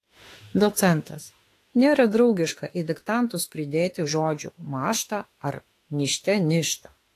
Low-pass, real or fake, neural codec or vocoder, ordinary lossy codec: 14.4 kHz; fake; autoencoder, 48 kHz, 32 numbers a frame, DAC-VAE, trained on Japanese speech; AAC, 48 kbps